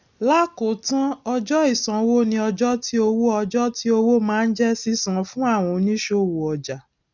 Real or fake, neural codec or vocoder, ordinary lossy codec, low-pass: real; none; none; 7.2 kHz